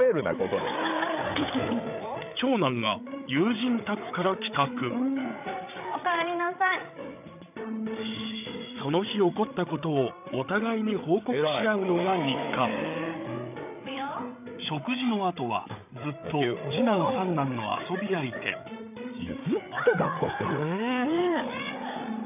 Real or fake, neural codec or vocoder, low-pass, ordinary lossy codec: fake; codec, 16 kHz, 8 kbps, FreqCodec, larger model; 3.6 kHz; none